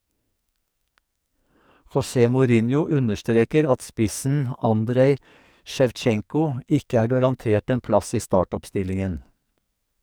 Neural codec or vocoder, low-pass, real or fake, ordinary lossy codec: codec, 44.1 kHz, 2.6 kbps, SNAC; none; fake; none